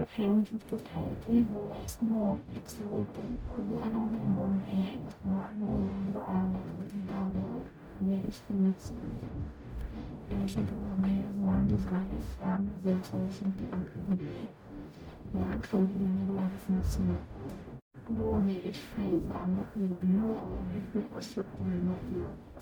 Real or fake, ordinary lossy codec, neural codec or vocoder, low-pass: fake; none; codec, 44.1 kHz, 0.9 kbps, DAC; none